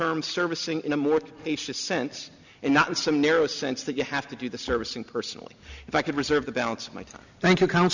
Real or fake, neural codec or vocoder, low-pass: real; none; 7.2 kHz